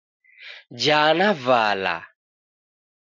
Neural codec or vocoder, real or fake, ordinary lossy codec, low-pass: none; real; MP3, 64 kbps; 7.2 kHz